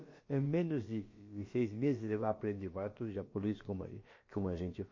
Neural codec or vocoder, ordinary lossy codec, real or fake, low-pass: codec, 16 kHz, about 1 kbps, DyCAST, with the encoder's durations; MP3, 32 kbps; fake; 7.2 kHz